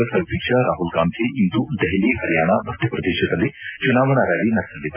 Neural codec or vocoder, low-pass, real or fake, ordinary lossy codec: none; 3.6 kHz; real; AAC, 32 kbps